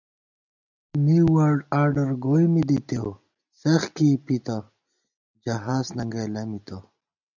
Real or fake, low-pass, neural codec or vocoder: real; 7.2 kHz; none